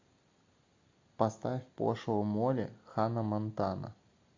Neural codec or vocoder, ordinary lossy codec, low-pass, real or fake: none; MP3, 48 kbps; 7.2 kHz; real